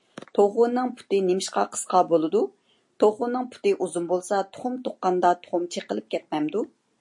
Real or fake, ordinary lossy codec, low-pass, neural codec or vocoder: real; MP3, 48 kbps; 10.8 kHz; none